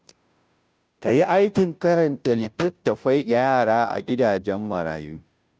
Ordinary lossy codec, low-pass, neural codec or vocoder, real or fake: none; none; codec, 16 kHz, 0.5 kbps, FunCodec, trained on Chinese and English, 25 frames a second; fake